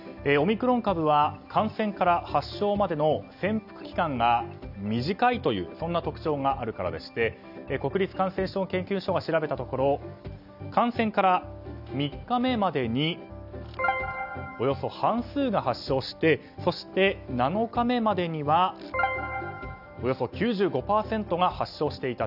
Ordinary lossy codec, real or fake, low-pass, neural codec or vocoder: none; real; 5.4 kHz; none